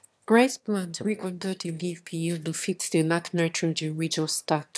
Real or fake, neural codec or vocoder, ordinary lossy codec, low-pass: fake; autoencoder, 22.05 kHz, a latent of 192 numbers a frame, VITS, trained on one speaker; none; none